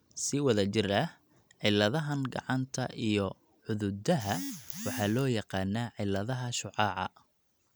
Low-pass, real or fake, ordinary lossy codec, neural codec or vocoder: none; real; none; none